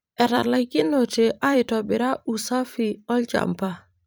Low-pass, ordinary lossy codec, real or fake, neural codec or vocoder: none; none; fake; vocoder, 44.1 kHz, 128 mel bands every 512 samples, BigVGAN v2